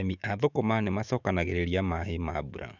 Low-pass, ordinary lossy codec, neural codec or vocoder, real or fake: 7.2 kHz; none; autoencoder, 48 kHz, 128 numbers a frame, DAC-VAE, trained on Japanese speech; fake